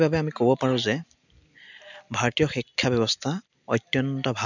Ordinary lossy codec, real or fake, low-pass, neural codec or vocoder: none; real; 7.2 kHz; none